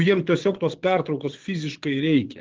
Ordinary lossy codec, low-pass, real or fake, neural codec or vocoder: Opus, 16 kbps; 7.2 kHz; fake; vocoder, 22.05 kHz, 80 mel bands, Vocos